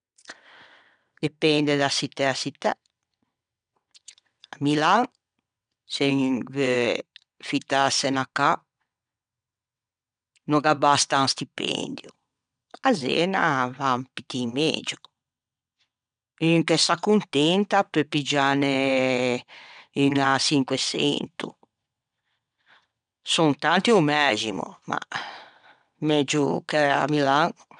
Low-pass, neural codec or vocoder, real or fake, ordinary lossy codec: 9.9 kHz; vocoder, 22.05 kHz, 80 mel bands, WaveNeXt; fake; none